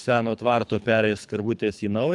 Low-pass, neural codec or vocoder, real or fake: 10.8 kHz; codec, 24 kHz, 3 kbps, HILCodec; fake